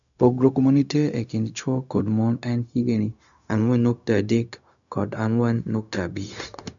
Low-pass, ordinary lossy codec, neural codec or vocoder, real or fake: 7.2 kHz; none; codec, 16 kHz, 0.4 kbps, LongCat-Audio-Codec; fake